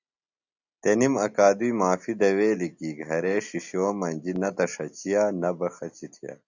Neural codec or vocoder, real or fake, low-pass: none; real; 7.2 kHz